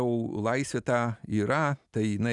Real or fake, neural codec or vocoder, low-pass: real; none; 10.8 kHz